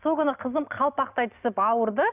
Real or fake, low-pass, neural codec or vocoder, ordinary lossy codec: real; 3.6 kHz; none; none